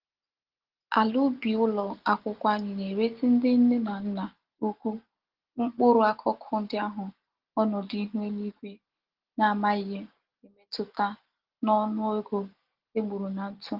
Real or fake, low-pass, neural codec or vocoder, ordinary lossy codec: real; 5.4 kHz; none; Opus, 16 kbps